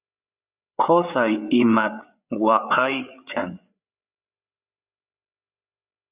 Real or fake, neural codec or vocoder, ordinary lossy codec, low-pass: fake; codec, 16 kHz, 8 kbps, FreqCodec, larger model; Opus, 64 kbps; 3.6 kHz